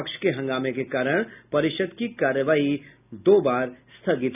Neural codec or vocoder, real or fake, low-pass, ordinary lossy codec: none; real; 3.6 kHz; none